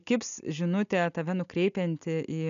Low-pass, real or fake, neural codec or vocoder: 7.2 kHz; real; none